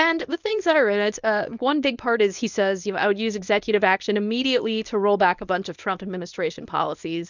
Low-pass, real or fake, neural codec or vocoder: 7.2 kHz; fake; codec, 24 kHz, 0.9 kbps, WavTokenizer, medium speech release version 1